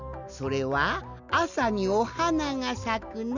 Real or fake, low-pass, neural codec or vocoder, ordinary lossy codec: real; 7.2 kHz; none; none